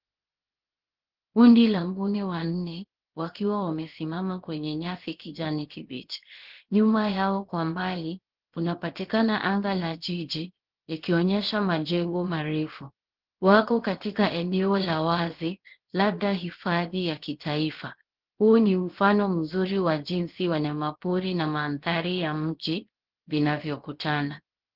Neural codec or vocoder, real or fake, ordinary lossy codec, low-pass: codec, 16 kHz, 0.7 kbps, FocalCodec; fake; Opus, 16 kbps; 5.4 kHz